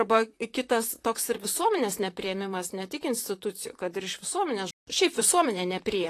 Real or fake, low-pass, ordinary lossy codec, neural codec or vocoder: fake; 14.4 kHz; AAC, 48 kbps; vocoder, 44.1 kHz, 128 mel bands, Pupu-Vocoder